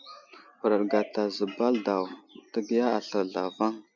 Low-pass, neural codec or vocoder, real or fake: 7.2 kHz; none; real